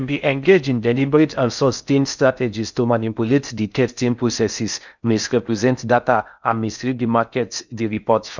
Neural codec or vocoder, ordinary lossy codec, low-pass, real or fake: codec, 16 kHz in and 24 kHz out, 0.6 kbps, FocalCodec, streaming, 4096 codes; none; 7.2 kHz; fake